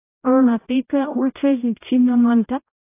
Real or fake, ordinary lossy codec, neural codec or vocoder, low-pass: fake; AAC, 24 kbps; codec, 16 kHz, 0.5 kbps, X-Codec, HuBERT features, trained on general audio; 3.6 kHz